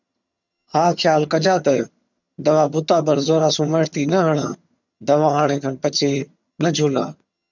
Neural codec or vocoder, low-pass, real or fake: vocoder, 22.05 kHz, 80 mel bands, HiFi-GAN; 7.2 kHz; fake